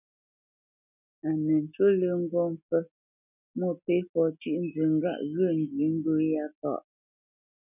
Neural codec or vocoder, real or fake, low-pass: none; real; 3.6 kHz